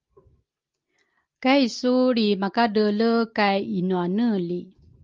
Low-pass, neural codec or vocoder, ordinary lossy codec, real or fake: 7.2 kHz; none; Opus, 32 kbps; real